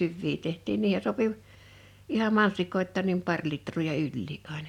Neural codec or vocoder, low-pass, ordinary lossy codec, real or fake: none; 19.8 kHz; none; real